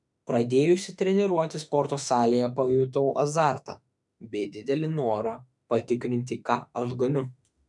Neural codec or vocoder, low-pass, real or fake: autoencoder, 48 kHz, 32 numbers a frame, DAC-VAE, trained on Japanese speech; 10.8 kHz; fake